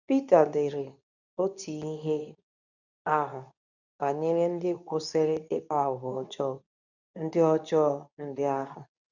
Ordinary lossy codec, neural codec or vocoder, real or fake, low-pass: none; codec, 24 kHz, 0.9 kbps, WavTokenizer, medium speech release version 2; fake; 7.2 kHz